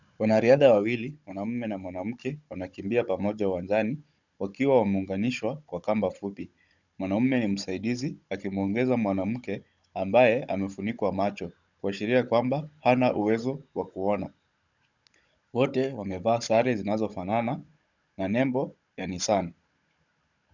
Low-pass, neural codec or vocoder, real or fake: 7.2 kHz; codec, 16 kHz, 16 kbps, FunCodec, trained on Chinese and English, 50 frames a second; fake